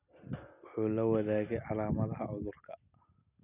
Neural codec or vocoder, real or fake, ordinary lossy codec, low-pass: none; real; none; 3.6 kHz